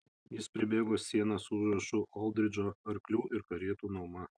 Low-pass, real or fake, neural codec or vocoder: 9.9 kHz; real; none